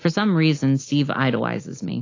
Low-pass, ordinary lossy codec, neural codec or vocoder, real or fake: 7.2 kHz; AAC, 32 kbps; none; real